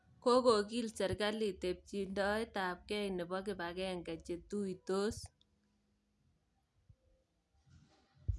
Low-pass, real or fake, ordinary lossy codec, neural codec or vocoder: none; real; none; none